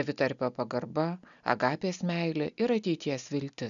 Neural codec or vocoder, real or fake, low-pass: none; real; 7.2 kHz